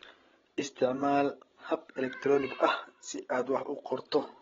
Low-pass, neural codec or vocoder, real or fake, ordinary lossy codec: 7.2 kHz; codec, 16 kHz, 16 kbps, FreqCodec, larger model; fake; AAC, 24 kbps